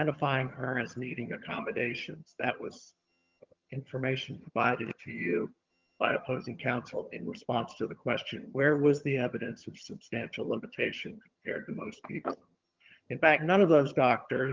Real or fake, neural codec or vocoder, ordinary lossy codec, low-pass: fake; vocoder, 22.05 kHz, 80 mel bands, HiFi-GAN; Opus, 32 kbps; 7.2 kHz